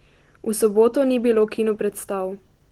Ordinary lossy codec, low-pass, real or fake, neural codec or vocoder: Opus, 16 kbps; 19.8 kHz; real; none